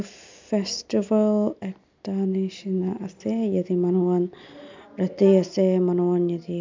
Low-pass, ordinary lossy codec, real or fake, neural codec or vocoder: 7.2 kHz; MP3, 64 kbps; real; none